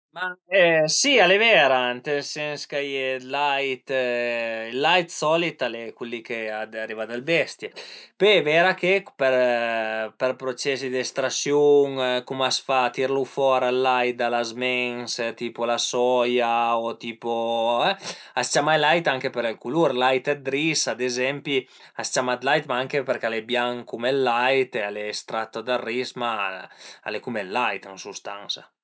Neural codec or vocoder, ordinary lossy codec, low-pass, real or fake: none; none; none; real